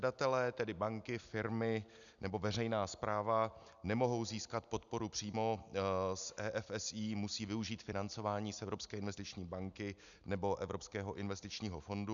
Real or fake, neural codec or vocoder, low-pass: real; none; 7.2 kHz